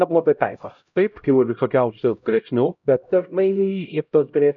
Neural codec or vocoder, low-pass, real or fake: codec, 16 kHz, 0.5 kbps, X-Codec, HuBERT features, trained on LibriSpeech; 7.2 kHz; fake